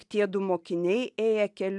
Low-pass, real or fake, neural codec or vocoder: 10.8 kHz; real; none